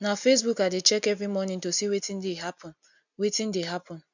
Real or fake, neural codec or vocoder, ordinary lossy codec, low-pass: real; none; none; 7.2 kHz